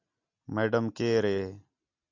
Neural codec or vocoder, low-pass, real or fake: none; 7.2 kHz; real